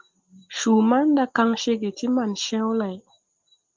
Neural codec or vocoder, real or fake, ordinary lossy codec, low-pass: none; real; Opus, 24 kbps; 7.2 kHz